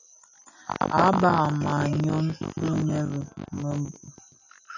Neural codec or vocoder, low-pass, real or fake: none; 7.2 kHz; real